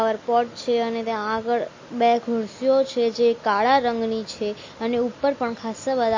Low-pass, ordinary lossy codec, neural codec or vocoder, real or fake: 7.2 kHz; MP3, 32 kbps; none; real